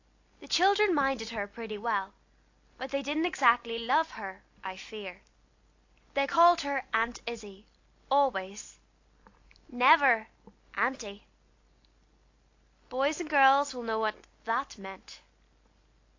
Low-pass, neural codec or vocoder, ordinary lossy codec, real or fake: 7.2 kHz; none; AAC, 48 kbps; real